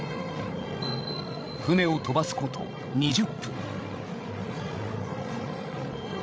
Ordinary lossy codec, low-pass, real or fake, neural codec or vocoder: none; none; fake; codec, 16 kHz, 16 kbps, FreqCodec, larger model